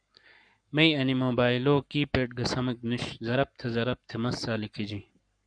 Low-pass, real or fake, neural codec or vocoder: 9.9 kHz; fake; codec, 44.1 kHz, 7.8 kbps, Pupu-Codec